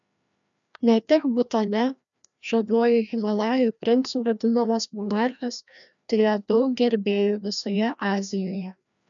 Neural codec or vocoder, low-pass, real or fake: codec, 16 kHz, 1 kbps, FreqCodec, larger model; 7.2 kHz; fake